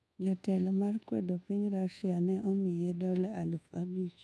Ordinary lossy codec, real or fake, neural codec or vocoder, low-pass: none; fake; codec, 24 kHz, 1.2 kbps, DualCodec; none